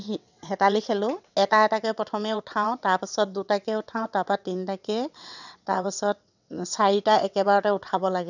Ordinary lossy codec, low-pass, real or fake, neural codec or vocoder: none; 7.2 kHz; fake; vocoder, 22.05 kHz, 80 mel bands, WaveNeXt